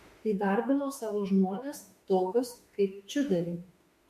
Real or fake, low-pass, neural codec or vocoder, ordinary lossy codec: fake; 14.4 kHz; autoencoder, 48 kHz, 32 numbers a frame, DAC-VAE, trained on Japanese speech; MP3, 64 kbps